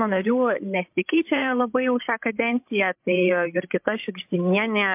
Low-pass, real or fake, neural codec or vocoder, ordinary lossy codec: 3.6 kHz; fake; codec, 16 kHz, 16 kbps, FreqCodec, larger model; MP3, 32 kbps